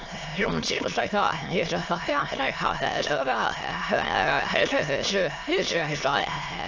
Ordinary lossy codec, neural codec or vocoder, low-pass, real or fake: AAC, 48 kbps; autoencoder, 22.05 kHz, a latent of 192 numbers a frame, VITS, trained on many speakers; 7.2 kHz; fake